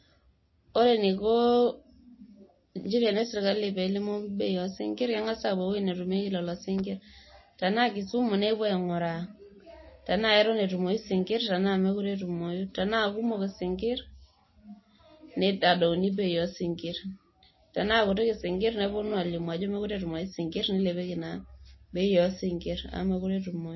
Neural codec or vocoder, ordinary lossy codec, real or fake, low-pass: none; MP3, 24 kbps; real; 7.2 kHz